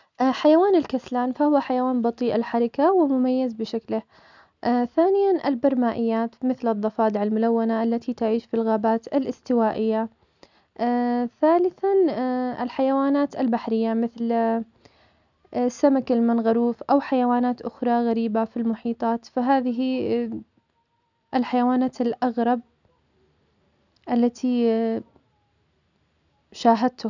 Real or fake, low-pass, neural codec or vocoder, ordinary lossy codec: real; 7.2 kHz; none; none